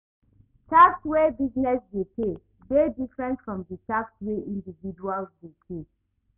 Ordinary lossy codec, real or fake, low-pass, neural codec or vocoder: none; real; 3.6 kHz; none